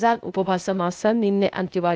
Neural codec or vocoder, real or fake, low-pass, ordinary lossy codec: codec, 16 kHz, 0.8 kbps, ZipCodec; fake; none; none